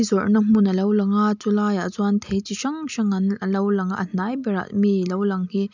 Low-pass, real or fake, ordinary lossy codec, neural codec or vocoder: 7.2 kHz; real; none; none